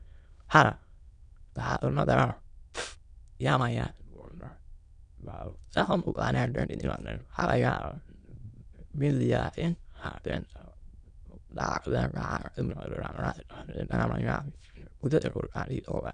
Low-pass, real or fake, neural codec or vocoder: 9.9 kHz; fake; autoencoder, 22.05 kHz, a latent of 192 numbers a frame, VITS, trained on many speakers